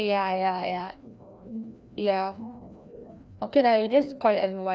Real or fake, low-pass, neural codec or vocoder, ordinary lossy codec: fake; none; codec, 16 kHz, 1 kbps, FreqCodec, larger model; none